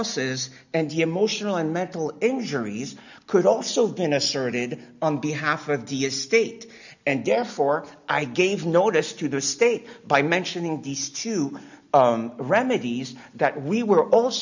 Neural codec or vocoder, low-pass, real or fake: none; 7.2 kHz; real